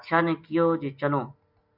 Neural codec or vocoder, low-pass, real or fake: none; 5.4 kHz; real